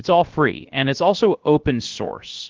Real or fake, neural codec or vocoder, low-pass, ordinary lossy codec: fake; codec, 16 kHz, 0.7 kbps, FocalCodec; 7.2 kHz; Opus, 16 kbps